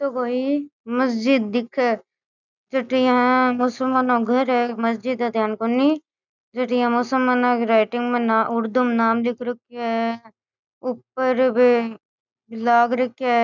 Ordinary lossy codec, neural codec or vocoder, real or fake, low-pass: none; none; real; 7.2 kHz